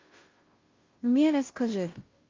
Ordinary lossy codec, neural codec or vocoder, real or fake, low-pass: Opus, 24 kbps; codec, 16 kHz, 0.5 kbps, FunCodec, trained on Chinese and English, 25 frames a second; fake; 7.2 kHz